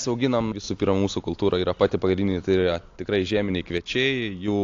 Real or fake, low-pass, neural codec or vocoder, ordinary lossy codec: real; 7.2 kHz; none; AAC, 48 kbps